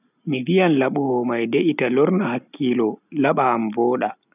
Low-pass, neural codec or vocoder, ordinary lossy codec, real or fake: 3.6 kHz; none; none; real